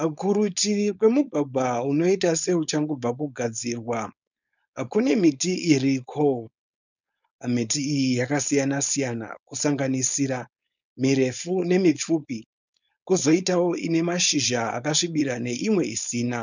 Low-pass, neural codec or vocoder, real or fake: 7.2 kHz; codec, 16 kHz, 4.8 kbps, FACodec; fake